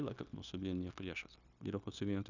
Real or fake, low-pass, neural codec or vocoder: fake; 7.2 kHz; codec, 16 kHz, 0.9 kbps, LongCat-Audio-Codec